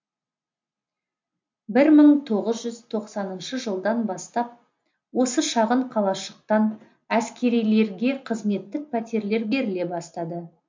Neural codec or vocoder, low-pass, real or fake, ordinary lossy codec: none; 7.2 kHz; real; MP3, 48 kbps